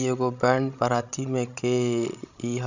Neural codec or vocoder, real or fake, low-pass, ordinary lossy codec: codec, 16 kHz, 16 kbps, FreqCodec, larger model; fake; 7.2 kHz; none